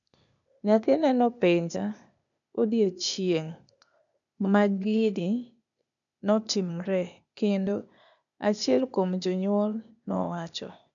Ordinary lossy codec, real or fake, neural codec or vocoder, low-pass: none; fake; codec, 16 kHz, 0.8 kbps, ZipCodec; 7.2 kHz